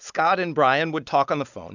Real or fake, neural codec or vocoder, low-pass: real; none; 7.2 kHz